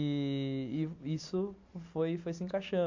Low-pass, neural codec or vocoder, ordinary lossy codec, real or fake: 7.2 kHz; none; AAC, 48 kbps; real